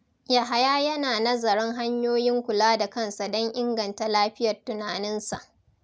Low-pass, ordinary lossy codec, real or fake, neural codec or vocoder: none; none; real; none